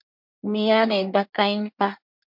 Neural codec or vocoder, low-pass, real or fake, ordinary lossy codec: codec, 24 kHz, 1 kbps, SNAC; 5.4 kHz; fake; MP3, 48 kbps